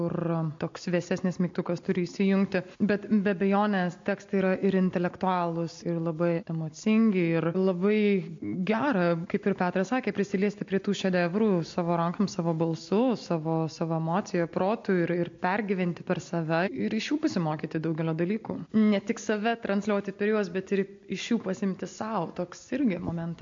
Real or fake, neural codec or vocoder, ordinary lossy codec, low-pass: real; none; MP3, 48 kbps; 7.2 kHz